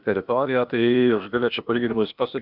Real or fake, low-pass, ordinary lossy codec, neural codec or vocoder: fake; 5.4 kHz; Opus, 64 kbps; codec, 16 kHz, 0.8 kbps, ZipCodec